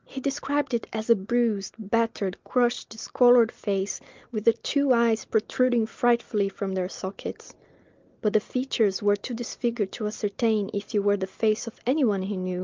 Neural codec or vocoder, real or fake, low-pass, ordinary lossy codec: none; real; 7.2 kHz; Opus, 24 kbps